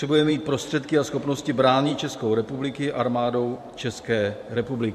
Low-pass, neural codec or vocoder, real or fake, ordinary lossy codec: 14.4 kHz; vocoder, 44.1 kHz, 128 mel bands every 512 samples, BigVGAN v2; fake; MP3, 64 kbps